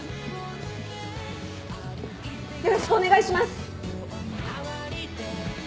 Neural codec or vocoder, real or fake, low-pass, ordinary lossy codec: none; real; none; none